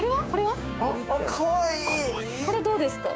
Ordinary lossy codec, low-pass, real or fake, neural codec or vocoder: none; none; fake; codec, 16 kHz, 6 kbps, DAC